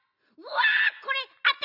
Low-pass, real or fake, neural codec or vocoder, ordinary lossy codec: 5.4 kHz; real; none; none